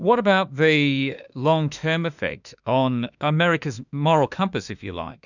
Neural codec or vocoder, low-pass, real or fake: autoencoder, 48 kHz, 32 numbers a frame, DAC-VAE, trained on Japanese speech; 7.2 kHz; fake